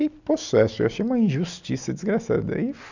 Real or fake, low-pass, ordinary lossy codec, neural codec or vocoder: real; 7.2 kHz; none; none